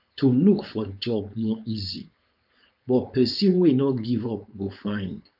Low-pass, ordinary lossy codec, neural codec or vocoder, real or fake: 5.4 kHz; none; codec, 16 kHz, 4.8 kbps, FACodec; fake